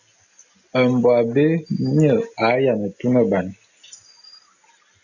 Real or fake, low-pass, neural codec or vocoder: real; 7.2 kHz; none